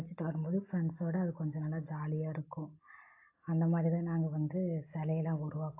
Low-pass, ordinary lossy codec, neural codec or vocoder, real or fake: 3.6 kHz; AAC, 24 kbps; none; real